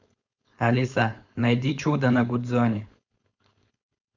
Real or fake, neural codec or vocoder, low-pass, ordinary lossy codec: fake; codec, 16 kHz, 4.8 kbps, FACodec; 7.2 kHz; Opus, 64 kbps